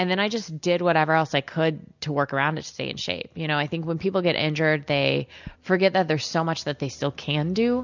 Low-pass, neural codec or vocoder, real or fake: 7.2 kHz; none; real